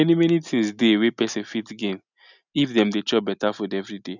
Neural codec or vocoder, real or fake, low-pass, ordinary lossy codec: none; real; 7.2 kHz; none